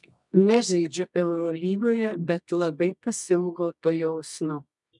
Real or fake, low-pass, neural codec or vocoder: fake; 10.8 kHz; codec, 24 kHz, 0.9 kbps, WavTokenizer, medium music audio release